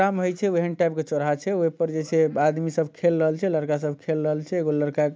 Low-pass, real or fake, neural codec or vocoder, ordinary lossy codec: none; real; none; none